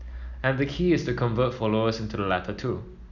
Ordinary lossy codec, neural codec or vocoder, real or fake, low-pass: none; none; real; 7.2 kHz